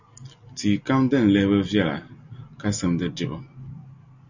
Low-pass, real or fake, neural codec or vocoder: 7.2 kHz; real; none